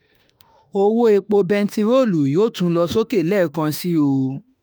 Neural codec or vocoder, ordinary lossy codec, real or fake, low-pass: autoencoder, 48 kHz, 32 numbers a frame, DAC-VAE, trained on Japanese speech; none; fake; none